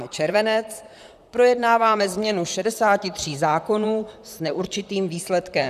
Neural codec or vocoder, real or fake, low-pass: vocoder, 44.1 kHz, 128 mel bands, Pupu-Vocoder; fake; 14.4 kHz